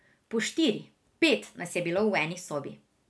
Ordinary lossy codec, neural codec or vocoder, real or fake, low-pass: none; none; real; none